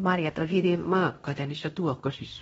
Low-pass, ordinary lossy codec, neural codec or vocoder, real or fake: 10.8 kHz; AAC, 24 kbps; codec, 16 kHz in and 24 kHz out, 0.9 kbps, LongCat-Audio-Codec, fine tuned four codebook decoder; fake